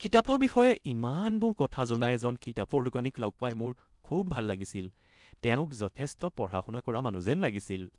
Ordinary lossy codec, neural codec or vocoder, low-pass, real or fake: none; codec, 16 kHz in and 24 kHz out, 0.6 kbps, FocalCodec, streaming, 4096 codes; 10.8 kHz; fake